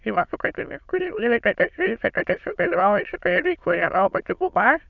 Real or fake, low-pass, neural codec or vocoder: fake; 7.2 kHz; autoencoder, 22.05 kHz, a latent of 192 numbers a frame, VITS, trained on many speakers